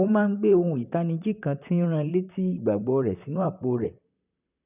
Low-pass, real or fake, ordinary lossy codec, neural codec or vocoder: 3.6 kHz; fake; none; vocoder, 44.1 kHz, 128 mel bands, Pupu-Vocoder